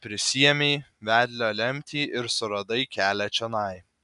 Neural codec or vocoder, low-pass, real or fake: none; 10.8 kHz; real